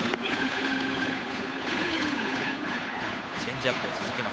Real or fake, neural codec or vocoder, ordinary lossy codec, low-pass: fake; codec, 16 kHz, 8 kbps, FunCodec, trained on Chinese and English, 25 frames a second; none; none